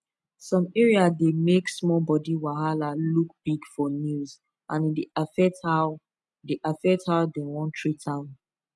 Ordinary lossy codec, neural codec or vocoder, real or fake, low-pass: none; none; real; none